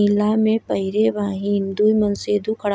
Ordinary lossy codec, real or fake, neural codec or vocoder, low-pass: none; real; none; none